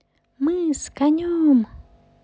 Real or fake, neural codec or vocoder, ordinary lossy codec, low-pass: real; none; none; none